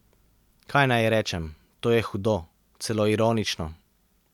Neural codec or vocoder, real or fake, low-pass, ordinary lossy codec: none; real; 19.8 kHz; none